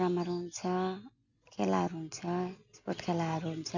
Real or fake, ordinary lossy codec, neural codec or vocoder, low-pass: real; none; none; 7.2 kHz